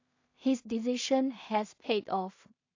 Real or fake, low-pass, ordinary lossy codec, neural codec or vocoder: fake; 7.2 kHz; AAC, 48 kbps; codec, 16 kHz in and 24 kHz out, 0.4 kbps, LongCat-Audio-Codec, two codebook decoder